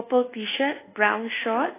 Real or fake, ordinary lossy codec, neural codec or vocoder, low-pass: fake; AAC, 16 kbps; codec, 24 kHz, 1.2 kbps, DualCodec; 3.6 kHz